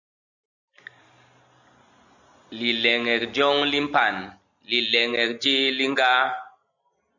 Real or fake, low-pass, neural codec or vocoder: real; 7.2 kHz; none